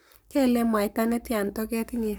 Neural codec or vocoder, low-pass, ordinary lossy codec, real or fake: codec, 44.1 kHz, 7.8 kbps, Pupu-Codec; none; none; fake